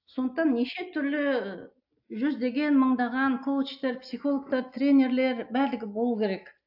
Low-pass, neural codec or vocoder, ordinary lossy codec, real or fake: 5.4 kHz; none; AAC, 48 kbps; real